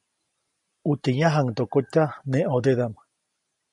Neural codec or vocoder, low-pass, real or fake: none; 10.8 kHz; real